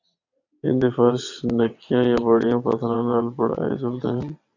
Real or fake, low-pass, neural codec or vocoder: fake; 7.2 kHz; vocoder, 22.05 kHz, 80 mel bands, WaveNeXt